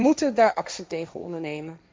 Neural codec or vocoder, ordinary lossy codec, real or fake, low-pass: codec, 16 kHz, 1.1 kbps, Voila-Tokenizer; none; fake; none